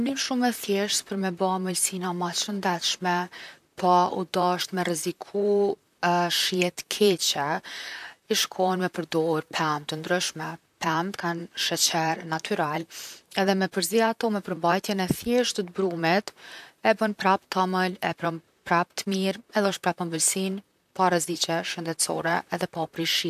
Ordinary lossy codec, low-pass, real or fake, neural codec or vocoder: none; 14.4 kHz; fake; vocoder, 44.1 kHz, 128 mel bands, Pupu-Vocoder